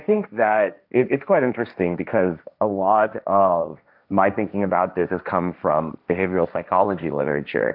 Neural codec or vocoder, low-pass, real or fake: codec, 16 kHz, 1.1 kbps, Voila-Tokenizer; 5.4 kHz; fake